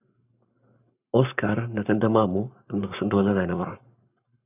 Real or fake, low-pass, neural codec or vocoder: fake; 3.6 kHz; codec, 44.1 kHz, 7.8 kbps, Pupu-Codec